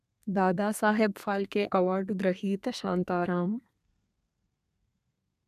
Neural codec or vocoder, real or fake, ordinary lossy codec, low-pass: codec, 32 kHz, 1.9 kbps, SNAC; fake; none; 14.4 kHz